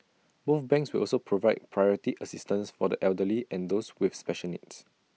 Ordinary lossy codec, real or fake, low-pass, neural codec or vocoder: none; real; none; none